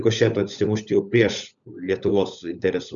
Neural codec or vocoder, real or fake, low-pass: codec, 16 kHz, 16 kbps, FunCodec, trained on LibriTTS, 50 frames a second; fake; 7.2 kHz